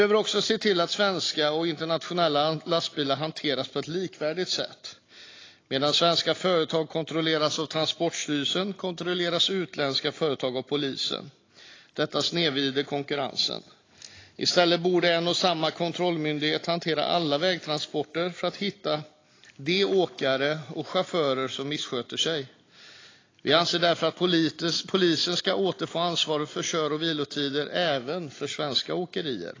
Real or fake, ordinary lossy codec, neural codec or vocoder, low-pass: real; AAC, 32 kbps; none; 7.2 kHz